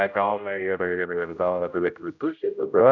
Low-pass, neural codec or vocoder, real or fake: 7.2 kHz; codec, 16 kHz, 0.5 kbps, X-Codec, HuBERT features, trained on general audio; fake